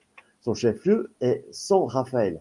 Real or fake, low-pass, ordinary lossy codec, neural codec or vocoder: real; 10.8 kHz; Opus, 32 kbps; none